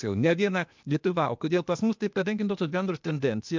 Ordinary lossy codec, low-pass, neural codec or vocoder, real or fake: MP3, 64 kbps; 7.2 kHz; codec, 16 kHz, 0.8 kbps, ZipCodec; fake